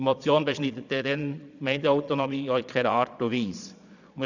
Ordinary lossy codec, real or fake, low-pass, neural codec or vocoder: none; fake; 7.2 kHz; vocoder, 22.05 kHz, 80 mel bands, Vocos